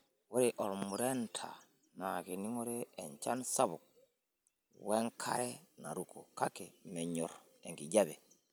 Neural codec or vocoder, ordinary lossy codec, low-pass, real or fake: none; none; none; real